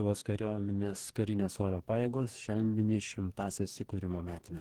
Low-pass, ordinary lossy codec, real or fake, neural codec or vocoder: 19.8 kHz; Opus, 16 kbps; fake; codec, 44.1 kHz, 2.6 kbps, DAC